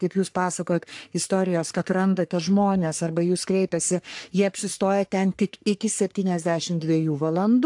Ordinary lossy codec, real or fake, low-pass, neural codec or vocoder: AAC, 64 kbps; fake; 10.8 kHz; codec, 44.1 kHz, 3.4 kbps, Pupu-Codec